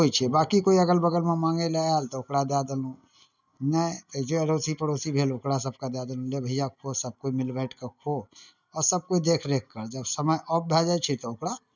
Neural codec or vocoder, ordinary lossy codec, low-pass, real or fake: none; none; 7.2 kHz; real